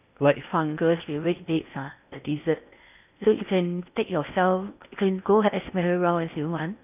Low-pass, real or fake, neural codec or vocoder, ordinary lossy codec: 3.6 kHz; fake; codec, 16 kHz in and 24 kHz out, 0.8 kbps, FocalCodec, streaming, 65536 codes; none